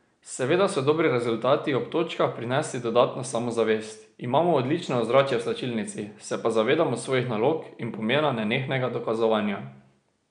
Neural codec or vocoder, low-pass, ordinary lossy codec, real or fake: none; 9.9 kHz; none; real